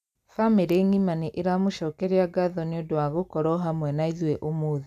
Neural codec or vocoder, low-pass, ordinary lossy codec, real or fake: none; 14.4 kHz; none; real